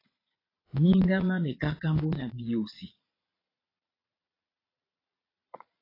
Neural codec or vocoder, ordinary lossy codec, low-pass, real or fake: vocoder, 22.05 kHz, 80 mel bands, Vocos; AAC, 24 kbps; 5.4 kHz; fake